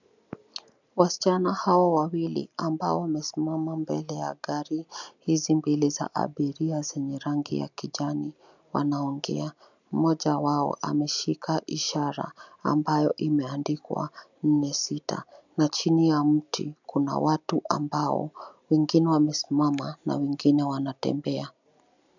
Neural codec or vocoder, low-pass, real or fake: none; 7.2 kHz; real